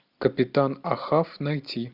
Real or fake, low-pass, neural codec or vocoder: real; 5.4 kHz; none